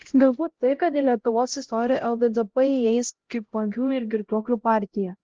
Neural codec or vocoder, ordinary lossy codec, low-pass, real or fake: codec, 16 kHz, 0.5 kbps, X-Codec, HuBERT features, trained on LibriSpeech; Opus, 16 kbps; 7.2 kHz; fake